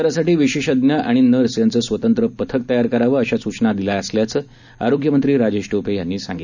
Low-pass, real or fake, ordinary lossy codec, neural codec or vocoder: 7.2 kHz; real; none; none